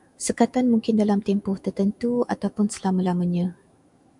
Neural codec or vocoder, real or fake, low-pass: autoencoder, 48 kHz, 128 numbers a frame, DAC-VAE, trained on Japanese speech; fake; 10.8 kHz